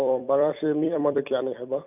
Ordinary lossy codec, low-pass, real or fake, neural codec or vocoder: none; 3.6 kHz; fake; vocoder, 44.1 kHz, 128 mel bands every 256 samples, BigVGAN v2